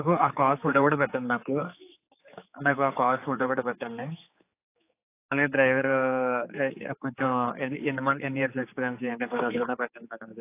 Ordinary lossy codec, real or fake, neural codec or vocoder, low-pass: AAC, 24 kbps; fake; codec, 16 kHz, 4 kbps, X-Codec, HuBERT features, trained on general audio; 3.6 kHz